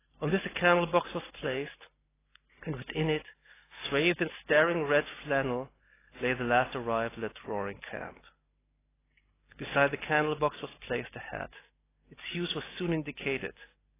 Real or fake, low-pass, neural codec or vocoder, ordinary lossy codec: real; 3.6 kHz; none; AAC, 16 kbps